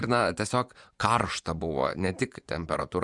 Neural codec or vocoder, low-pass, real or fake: vocoder, 24 kHz, 100 mel bands, Vocos; 10.8 kHz; fake